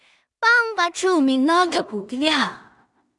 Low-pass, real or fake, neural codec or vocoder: 10.8 kHz; fake; codec, 16 kHz in and 24 kHz out, 0.4 kbps, LongCat-Audio-Codec, two codebook decoder